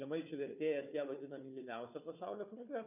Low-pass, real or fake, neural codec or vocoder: 3.6 kHz; fake; codec, 16 kHz, 4 kbps, FunCodec, trained on Chinese and English, 50 frames a second